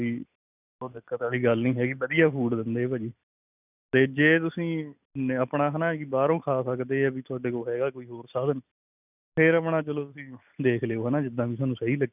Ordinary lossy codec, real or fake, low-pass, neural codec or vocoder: none; real; 3.6 kHz; none